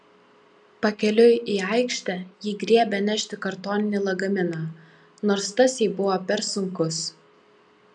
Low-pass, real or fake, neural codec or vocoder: 9.9 kHz; real; none